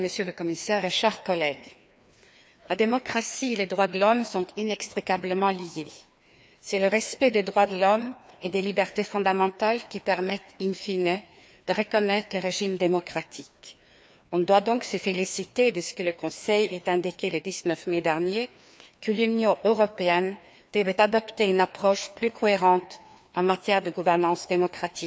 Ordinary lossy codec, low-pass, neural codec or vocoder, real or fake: none; none; codec, 16 kHz, 2 kbps, FreqCodec, larger model; fake